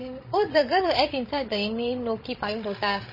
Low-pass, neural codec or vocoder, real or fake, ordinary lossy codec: 5.4 kHz; codec, 16 kHz, 16 kbps, FreqCodec, larger model; fake; MP3, 24 kbps